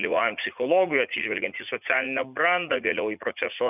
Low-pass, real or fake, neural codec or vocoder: 3.6 kHz; fake; vocoder, 44.1 kHz, 80 mel bands, Vocos